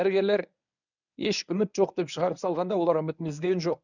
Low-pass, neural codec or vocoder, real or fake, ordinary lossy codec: 7.2 kHz; codec, 24 kHz, 0.9 kbps, WavTokenizer, medium speech release version 1; fake; none